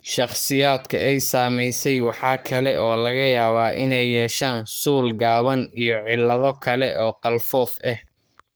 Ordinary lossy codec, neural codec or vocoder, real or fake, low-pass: none; codec, 44.1 kHz, 3.4 kbps, Pupu-Codec; fake; none